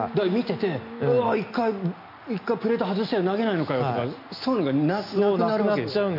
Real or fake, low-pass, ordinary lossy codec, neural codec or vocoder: real; 5.4 kHz; none; none